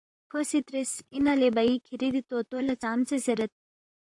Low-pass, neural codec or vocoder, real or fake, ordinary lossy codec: 10.8 kHz; vocoder, 44.1 kHz, 128 mel bands, Pupu-Vocoder; fake; AAC, 64 kbps